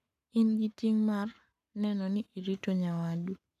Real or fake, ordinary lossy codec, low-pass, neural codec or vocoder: fake; none; 14.4 kHz; codec, 44.1 kHz, 7.8 kbps, Pupu-Codec